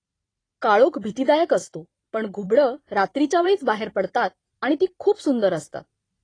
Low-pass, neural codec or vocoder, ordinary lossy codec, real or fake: 9.9 kHz; vocoder, 44.1 kHz, 128 mel bands every 512 samples, BigVGAN v2; AAC, 32 kbps; fake